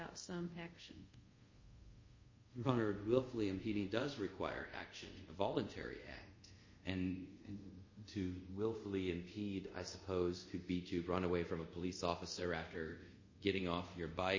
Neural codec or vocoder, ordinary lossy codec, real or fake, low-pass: codec, 24 kHz, 0.5 kbps, DualCodec; MP3, 32 kbps; fake; 7.2 kHz